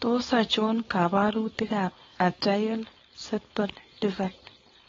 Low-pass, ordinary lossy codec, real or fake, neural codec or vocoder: 7.2 kHz; AAC, 24 kbps; fake; codec, 16 kHz, 4.8 kbps, FACodec